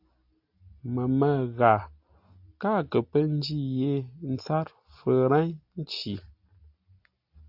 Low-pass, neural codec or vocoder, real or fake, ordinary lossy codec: 5.4 kHz; none; real; AAC, 48 kbps